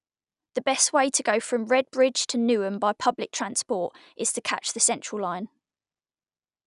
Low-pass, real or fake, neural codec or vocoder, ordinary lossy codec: 10.8 kHz; real; none; none